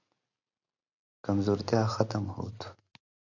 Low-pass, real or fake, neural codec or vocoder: 7.2 kHz; real; none